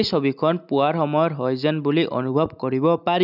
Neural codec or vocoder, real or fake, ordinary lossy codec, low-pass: none; real; none; 5.4 kHz